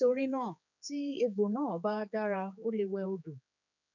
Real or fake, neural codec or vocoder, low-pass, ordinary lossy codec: fake; codec, 16 kHz, 4 kbps, X-Codec, HuBERT features, trained on general audio; 7.2 kHz; none